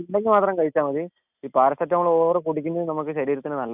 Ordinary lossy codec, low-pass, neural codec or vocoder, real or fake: none; 3.6 kHz; none; real